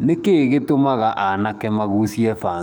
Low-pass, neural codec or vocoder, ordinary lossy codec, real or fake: none; codec, 44.1 kHz, 7.8 kbps, DAC; none; fake